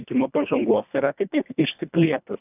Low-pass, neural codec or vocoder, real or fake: 3.6 kHz; codec, 24 kHz, 1.5 kbps, HILCodec; fake